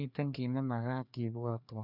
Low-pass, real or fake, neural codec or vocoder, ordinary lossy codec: 5.4 kHz; fake; codec, 16 kHz, 2 kbps, FreqCodec, larger model; none